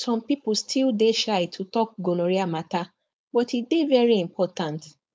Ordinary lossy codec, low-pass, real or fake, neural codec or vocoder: none; none; fake; codec, 16 kHz, 4.8 kbps, FACodec